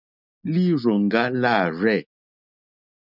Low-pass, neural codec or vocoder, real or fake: 5.4 kHz; none; real